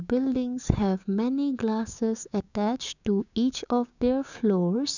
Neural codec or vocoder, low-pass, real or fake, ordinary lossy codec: codec, 16 kHz, 6 kbps, DAC; 7.2 kHz; fake; none